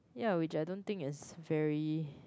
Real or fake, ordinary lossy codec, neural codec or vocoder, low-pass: real; none; none; none